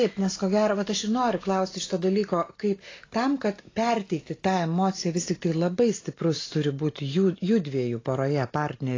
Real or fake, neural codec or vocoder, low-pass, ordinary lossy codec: real; none; 7.2 kHz; AAC, 32 kbps